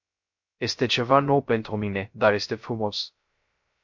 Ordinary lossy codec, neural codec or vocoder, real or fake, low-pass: MP3, 48 kbps; codec, 16 kHz, 0.3 kbps, FocalCodec; fake; 7.2 kHz